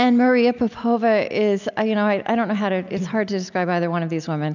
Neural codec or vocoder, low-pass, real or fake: none; 7.2 kHz; real